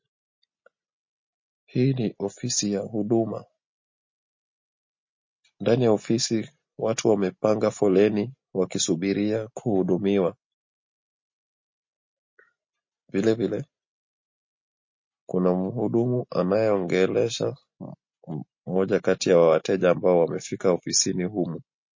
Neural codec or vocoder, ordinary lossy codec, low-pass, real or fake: none; MP3, 32 kbps; 7.2 kHz; real